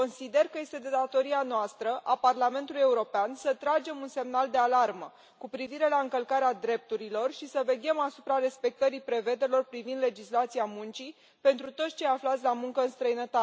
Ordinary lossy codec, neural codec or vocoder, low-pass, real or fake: none; none; none; real